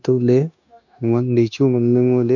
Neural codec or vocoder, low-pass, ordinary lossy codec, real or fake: codec, 16 kHz, 0.9 kbps, LongCat-Audio-Codec; 7.2 kHz; none; fake